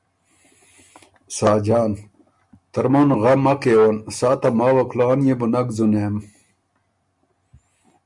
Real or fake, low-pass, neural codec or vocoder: real; 10.8 kHz; none